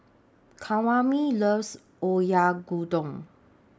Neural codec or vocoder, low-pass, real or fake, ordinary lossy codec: none; none; real; none